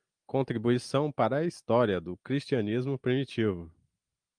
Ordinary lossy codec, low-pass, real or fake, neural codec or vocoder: Opus, 32 kbps; 9.9 kHz; real; none